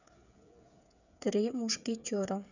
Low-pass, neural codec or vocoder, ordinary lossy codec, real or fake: 7.2 kHz; codec, 16 kHz, 8 kbps, FreqCodec, smaller model; none; fake